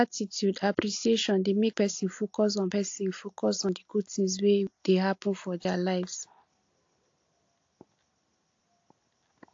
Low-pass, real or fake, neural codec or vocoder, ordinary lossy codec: 7.2 kHz; real; none; AAC, 48 kbps